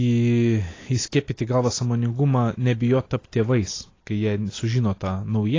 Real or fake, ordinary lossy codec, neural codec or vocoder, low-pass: real; AAC, 32 kbps; none; 7.2 kHz